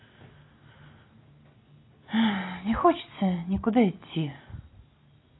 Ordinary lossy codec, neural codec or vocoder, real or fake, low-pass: AAC, 16 kbps; none; real; 7.2 kHz